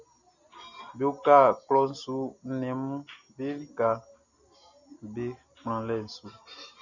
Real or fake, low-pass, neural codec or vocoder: real; 7.2 kHz; none